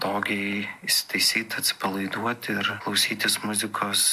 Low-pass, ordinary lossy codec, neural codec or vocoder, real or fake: 14.4 kHz; AAC, 96 kbps; none; real